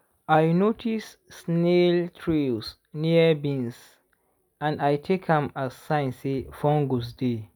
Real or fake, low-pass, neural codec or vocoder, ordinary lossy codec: real; none; none; none